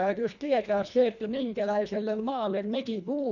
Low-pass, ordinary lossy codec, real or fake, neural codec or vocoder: 7.2 kHz; none; fake; codec, 24 kHz, 1.5 kbps, HILCodec